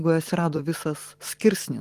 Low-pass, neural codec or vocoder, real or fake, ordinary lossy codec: 14.4 kHz; vocoder, 44.1 kHz, 128 mel bands every 256 samples, BigVGAN v2; fake; Opus, 24 kbps